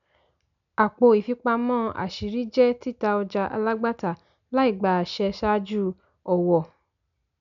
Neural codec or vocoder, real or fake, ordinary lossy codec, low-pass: none; real; none; 7.2 kHz